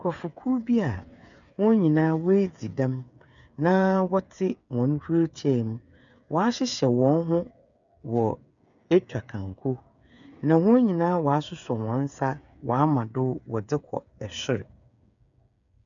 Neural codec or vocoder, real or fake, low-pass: codec, 16 kHz, 8 kbps, FreqCodec, smaller model; fake; 7.2 kHz